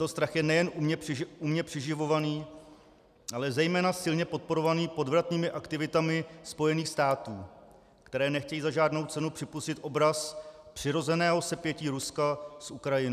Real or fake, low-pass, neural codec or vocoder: real; 14.4 kHz; none